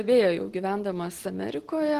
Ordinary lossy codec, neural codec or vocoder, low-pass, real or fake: Opus, 16 kbps; none; 14.4 kHz; real